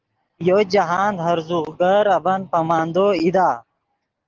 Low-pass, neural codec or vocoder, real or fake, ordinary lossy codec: 7.2 kHz; none; real; Opus, 16 kbps